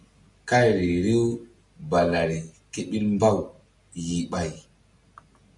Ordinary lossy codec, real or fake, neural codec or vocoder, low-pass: MP3, 96 kbps; real; none; 10.8 kHz